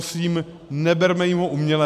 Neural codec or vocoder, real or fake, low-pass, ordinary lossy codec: none; real; 14.4 kHz; AAC, 96 kbps